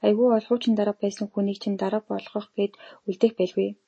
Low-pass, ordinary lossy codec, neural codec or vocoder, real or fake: 9.9 kHz; MP3, 32 kbps; none; real